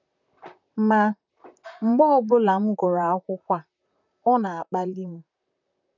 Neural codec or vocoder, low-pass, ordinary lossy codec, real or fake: vocoder, 44.1 kHz, 128 mel bands, Pupu-Vocoder; 7.2 kHz; none; fake